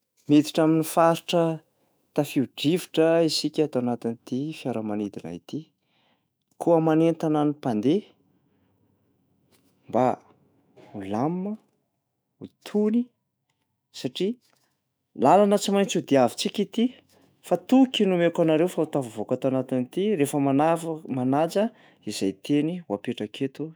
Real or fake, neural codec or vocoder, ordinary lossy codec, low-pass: fake; autoencoder, 48 kHz, 128 numbers a frame, DAC-VAE, trained on Japanese speech; none; none